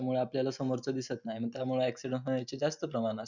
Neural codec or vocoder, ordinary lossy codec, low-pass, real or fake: none; none; 7.2 kHz; real